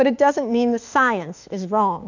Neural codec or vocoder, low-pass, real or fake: autoencoder, 48 kHz, 32 numbers a frame, DAC-VAE, trained on Japanese speech; 7.2 kHz; fake